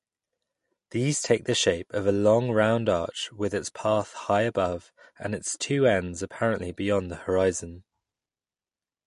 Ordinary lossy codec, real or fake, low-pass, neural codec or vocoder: MP3, 48 kbps; real; 14.4 kHz; none